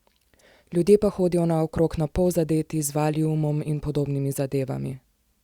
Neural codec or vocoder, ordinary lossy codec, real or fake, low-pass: none; Opus, 64 kbps; real; 19.8 kHz